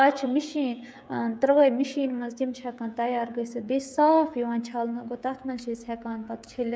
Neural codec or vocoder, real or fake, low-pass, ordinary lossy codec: codec, 16 kHz, 16 kbps, FreqCodec, smaller model; fake; none; none